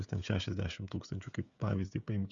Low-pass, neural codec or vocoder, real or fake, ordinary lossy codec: 7.2 kHz; codec, 16 kHz, 16 kbps, FreqCodec, smaller model; fake; Opus, 64 kbps